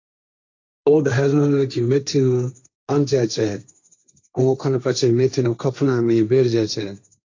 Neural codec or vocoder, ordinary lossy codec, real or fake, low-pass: codec, 16 kHz, 1.1 kbps, Voila-Tokenizer; AAC, 48 kbps; fake; 7.2 kHz